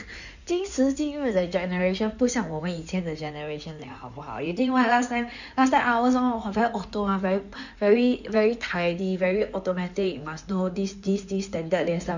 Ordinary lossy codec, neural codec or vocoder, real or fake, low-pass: none; codec, 16 kHz in and 24 kHz out, 2.2 kbps, FireRedTTS-2 codec; fake; 7.2 kHz